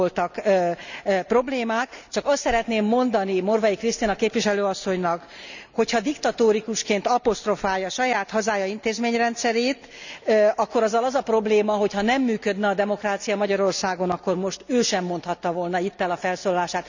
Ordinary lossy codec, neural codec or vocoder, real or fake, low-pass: none; none; real; 7.2 kHz